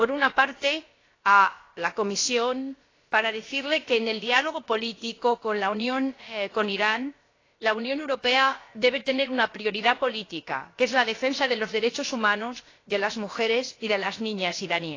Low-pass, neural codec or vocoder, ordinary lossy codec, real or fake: 7.2 kHz; codec, 16 kHz, about 1 kbps, DyCAST, with the encoder's durations; AAC, 32 kbps; fake